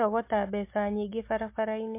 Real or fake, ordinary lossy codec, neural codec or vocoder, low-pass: real; MP3, 24 kbps; none; 3.6 kHz